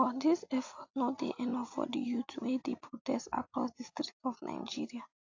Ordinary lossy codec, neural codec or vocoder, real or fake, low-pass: AAC, 48 kbps; none; real; 7.2 kHz